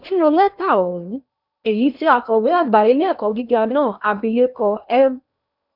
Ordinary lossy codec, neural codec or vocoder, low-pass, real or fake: none; codec, 16 kHz in and 24 kHz out, 0.8 kbps, FocalCodec, streaming, 65536 codes; 5.4 kHz; fake